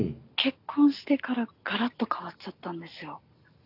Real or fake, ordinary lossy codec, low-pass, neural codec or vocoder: real; none; 5.4 kHz; none